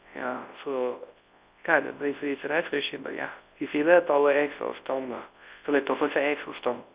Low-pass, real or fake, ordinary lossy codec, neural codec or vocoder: 3.6 kHz; fake; Opus, 64 kbps; codec, 24 kHz, 0.9 kbps, WavTokenizer, large speech release